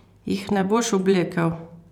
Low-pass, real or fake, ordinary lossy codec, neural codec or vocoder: 19.8 kHz; fake; none; vocoder, 44.1 kHz, 128 mel bands every 512 samples, BigVGAN v2